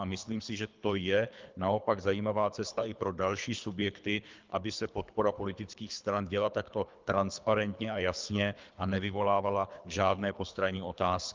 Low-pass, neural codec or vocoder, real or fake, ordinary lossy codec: 7.2 kHz; codec, 24 kHz, 3 kbps, HILCodec; fake; Opus, 24 kbps